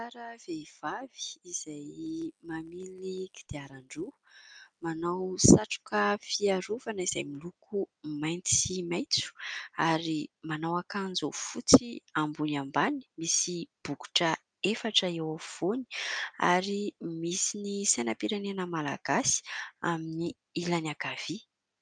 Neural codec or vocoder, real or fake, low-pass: none; real; 9.9 kHz